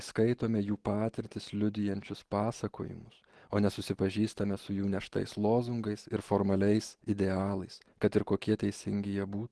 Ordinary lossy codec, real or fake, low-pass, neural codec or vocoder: Opus, 16 kbps; real; 10.8 kHz; none